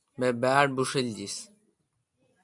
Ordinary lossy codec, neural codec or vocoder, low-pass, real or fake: MP3, 96 kbps; none; 10.8 kHz; real